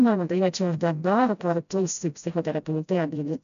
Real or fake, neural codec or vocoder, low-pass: fake; codec, 16 kHz, 0.5 kbps, FreqCodec, smaller model; 7.2 kHz